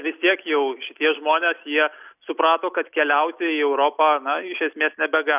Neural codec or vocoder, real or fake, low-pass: none; real; 3.6 kHz